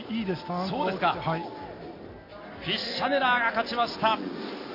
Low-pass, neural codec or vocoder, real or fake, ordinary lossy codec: 5.4 kHz; none; real; AAC, 32 kbps